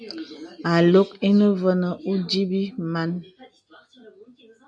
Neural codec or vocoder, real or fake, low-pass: none; real; 9.9 kHz